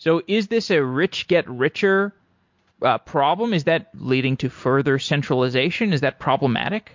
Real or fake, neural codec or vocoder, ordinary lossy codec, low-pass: real; none; MP3, 48 kbps; 7.2 kHz